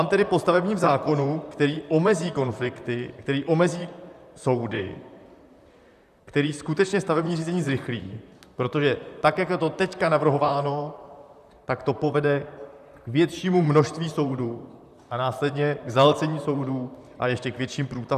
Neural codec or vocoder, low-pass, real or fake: vocoder, 44.1 kHz, 128 mel bands, Pupu-Vocoder; 14.4 kHz; fake